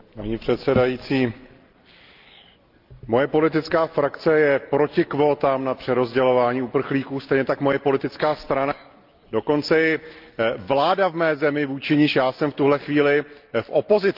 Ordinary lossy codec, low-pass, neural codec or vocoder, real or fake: Opus, 24 kbps; 5.4 kHz; none; real